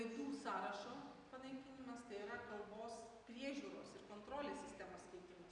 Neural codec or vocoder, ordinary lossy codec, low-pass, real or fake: none; MP3, 96 kbps; 9.9 kHz; real